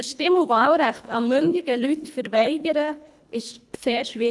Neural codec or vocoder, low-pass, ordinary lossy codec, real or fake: codec, 24 kHz, 1.5 kbps, HILCodec; none; none; fake